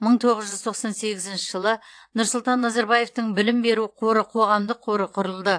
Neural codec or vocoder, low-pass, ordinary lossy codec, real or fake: vocoder, 22.05 kHz, 80 mel bands, WaveNeXt; 9.9 kHz; AAC, 64 kbps; fake